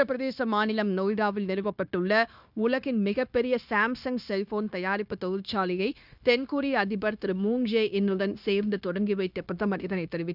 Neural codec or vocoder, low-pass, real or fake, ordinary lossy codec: codec, 16 kHz, 0.9 kbps, LongCat-Audio-Codec; 5.4 kHz; fake; none